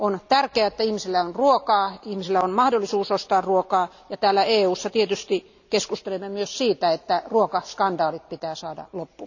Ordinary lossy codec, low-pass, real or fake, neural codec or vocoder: none; 7.2 kHz; real; none